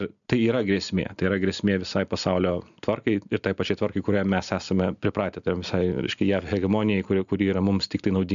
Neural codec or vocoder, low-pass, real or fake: none; 7.2 kHz; real